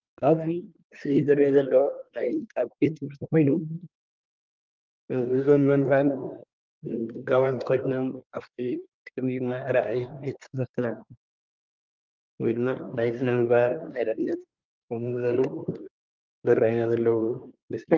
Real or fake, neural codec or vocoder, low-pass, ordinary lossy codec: fake; codec, 24 kHz, 1 kbps, SNAC; 7.2 kHz; Opus, 32 kbps